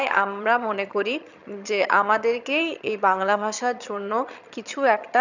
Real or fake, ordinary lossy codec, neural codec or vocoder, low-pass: fake; none; vocoder, 22.05 kHz, 80 mel bands, HiFi-GAN; 7.2 kHz